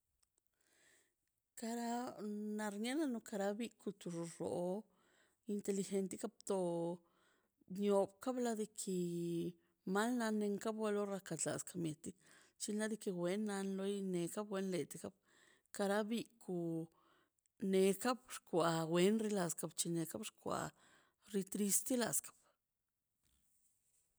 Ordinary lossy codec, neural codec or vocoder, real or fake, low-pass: none; none; real; none